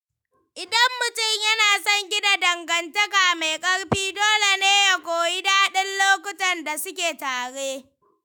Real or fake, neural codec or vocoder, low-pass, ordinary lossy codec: fake; autoencoder, 48 kHz, 128 numbers a frame, DAC-VAE, trained on Japanese speech; none; none